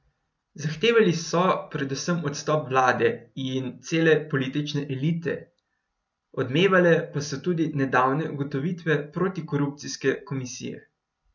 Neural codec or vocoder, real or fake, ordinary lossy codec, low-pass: none; real; none; 7.2 kHz